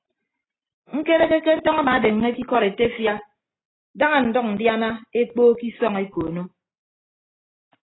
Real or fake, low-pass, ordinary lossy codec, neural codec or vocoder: real; 7.2 kHz; AAC, 16 kbps; none